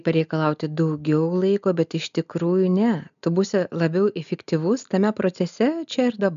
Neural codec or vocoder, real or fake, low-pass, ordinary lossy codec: none; real; 7.2 kHz; MP3, 96 kbps